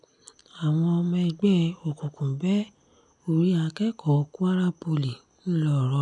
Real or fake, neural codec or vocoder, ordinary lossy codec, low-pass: real; none; none; 10.8 kHz